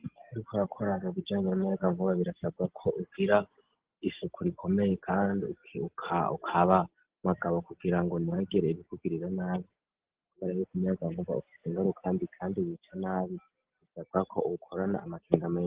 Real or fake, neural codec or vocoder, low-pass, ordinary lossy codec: real; none; 3.6 kHz; Opus, 16 kbps